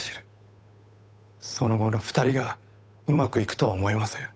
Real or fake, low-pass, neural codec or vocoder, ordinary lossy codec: fake; none; codec, 16 kHz, 8 kbps, FunCodec, trained on Chinese and English, 25 frames a second; none